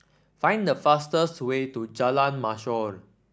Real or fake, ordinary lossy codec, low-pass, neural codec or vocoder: real; none; none; none